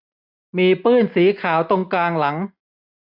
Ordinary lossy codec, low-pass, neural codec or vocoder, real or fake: none; 5.4 kHz; none; real